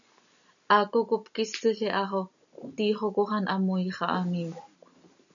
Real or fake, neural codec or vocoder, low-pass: real; none; 7.2 kHz